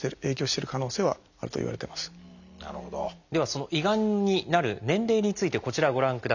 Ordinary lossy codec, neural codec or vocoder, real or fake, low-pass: none; none; real; 7.2 kHz